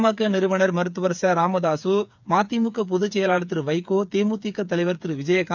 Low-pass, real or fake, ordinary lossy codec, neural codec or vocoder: 7.2 kHz; fake; none; codec, 16 kHz, 8 kbps, FreqCodec, smaller model